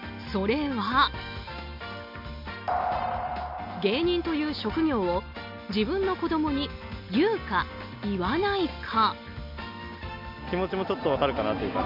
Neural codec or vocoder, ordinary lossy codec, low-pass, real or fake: none; MP3, 48 kbps; 5.4 kHz; real